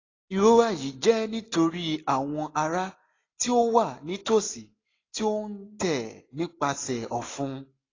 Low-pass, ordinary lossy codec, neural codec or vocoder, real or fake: 7.2 kHz; AAC, 32 kbps; none; real